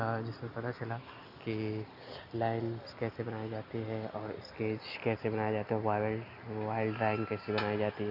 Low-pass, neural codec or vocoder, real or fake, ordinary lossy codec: 5.4 kHz; none; real; none